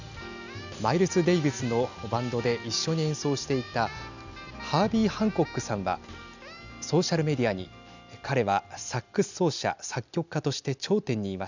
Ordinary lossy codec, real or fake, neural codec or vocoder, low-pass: none; real; none; 7.2 kHz